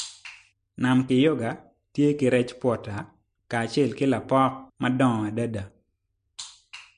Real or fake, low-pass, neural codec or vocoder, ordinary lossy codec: real; 9.9 kHz; none; MP3, 48 kbps